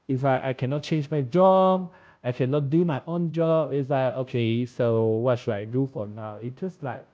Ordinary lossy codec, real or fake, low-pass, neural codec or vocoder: none; fake; none; codec, 16 kHz, 0.5 kbps, FunCodec, trained on Chinese and English, 25 frames a second